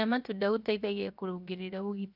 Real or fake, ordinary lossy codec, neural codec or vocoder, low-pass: fake; none; codec, 16 kHz, 0.7 kbps, FocalCodec; 5.4 kHz